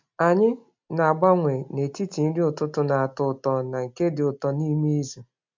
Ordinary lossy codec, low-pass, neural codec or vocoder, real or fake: MP3, 64 kbps; 7.2 kHz; none; real